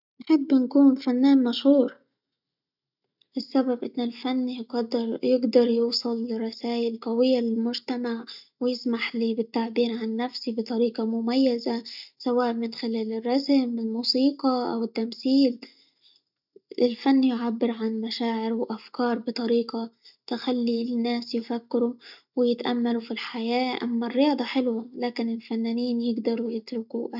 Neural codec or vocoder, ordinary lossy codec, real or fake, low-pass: none; none; real; 5.4 kHz